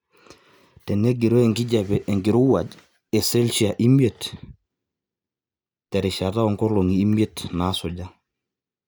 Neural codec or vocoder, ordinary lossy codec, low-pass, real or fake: none; none; none; real